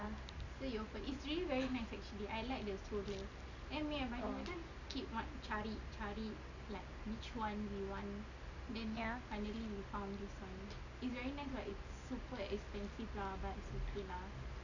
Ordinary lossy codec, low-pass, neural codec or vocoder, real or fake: none; 7.2 kHz; none; real